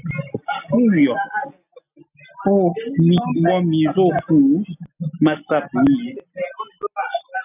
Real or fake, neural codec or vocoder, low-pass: real; none; 3.6 kHz